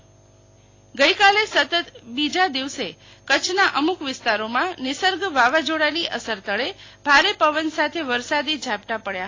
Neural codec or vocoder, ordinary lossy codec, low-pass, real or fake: none; AAC, 32 kbps; 7.2 kHz; real